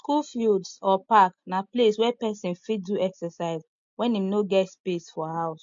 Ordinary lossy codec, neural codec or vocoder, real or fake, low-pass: MP3, 48 kbps; none; real; 7.2 kHz